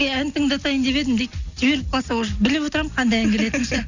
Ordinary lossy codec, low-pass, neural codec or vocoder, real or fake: none; 7.2 kHz; none; real